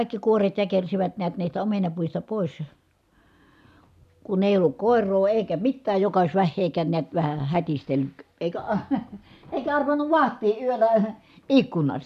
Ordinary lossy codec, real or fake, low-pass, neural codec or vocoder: none; real; 14.4 kHz; none